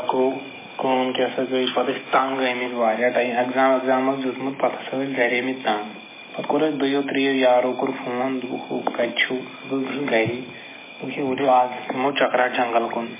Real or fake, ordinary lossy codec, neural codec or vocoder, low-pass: real; MP3, 16 kbps; none; 3.6 kHz